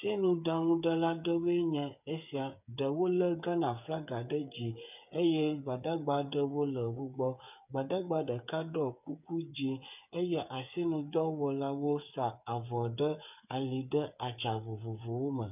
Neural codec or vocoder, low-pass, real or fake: codec, 16 kHz, 8 kbps, FreqCodec, smaller model; 3.6 kHz; fake